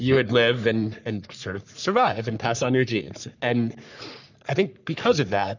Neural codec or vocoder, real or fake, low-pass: codec, 44.1 kHz, 3.4 kbps, Pupu-Codec; fake; 7.2 kHz